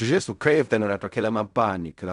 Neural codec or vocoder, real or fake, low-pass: codec, 16 kHz in and 24 kHz out, 0.4 kbps, LongCat-Audio-Codec, fine tuned four codebook decoder; fake; 10.8 kHz